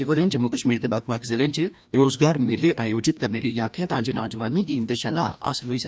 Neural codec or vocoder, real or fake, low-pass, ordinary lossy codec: codec, 16 kHz, 1 kbps, FreqCodec, larger model; fake; none; none